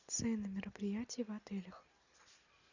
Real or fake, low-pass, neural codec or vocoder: real; 7.2 kHz; none